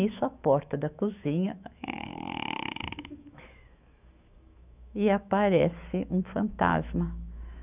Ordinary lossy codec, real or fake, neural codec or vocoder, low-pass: none; fake; autoencoder, 48 kHz, 128 numbers a frame, DAC-VAE, trained on Japanese speech; 3.6 kHz